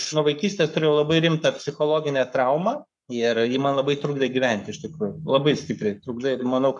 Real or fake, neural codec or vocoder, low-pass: fake; codec, 44.1 kHz, 7.8 kbps, Pupu-Codec; 10.8 kHz